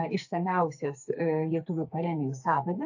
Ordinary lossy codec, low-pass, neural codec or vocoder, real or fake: AAC, 48 kbps; 7.2 kHz; codec, 44.1 kHz, 2.6 kbps, SNAC; fake